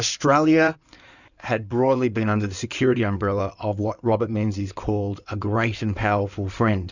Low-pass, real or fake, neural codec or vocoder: 7.2 kHz; fake; codec, 16 kHz in and 24 kHz out, 2.2 kbps, FireRedTTS-2 codec